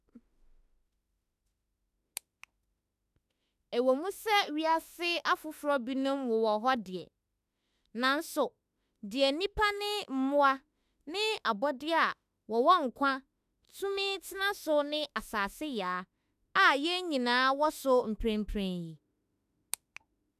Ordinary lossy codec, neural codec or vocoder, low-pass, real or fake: none; autoencoder, 48 kHz, 32 numbers a frame, DAC-VAE, trained on Japanese speech; 14.4 kHz; fake